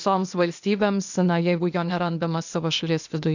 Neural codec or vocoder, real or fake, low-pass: codec, 16 kHz, 0.8 kbps, ZipCodec; fake; 7.2 kHz